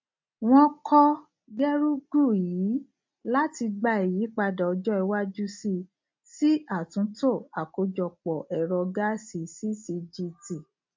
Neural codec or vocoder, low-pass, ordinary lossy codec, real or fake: none; 7.2 kHz; MP3, 48 kbps; real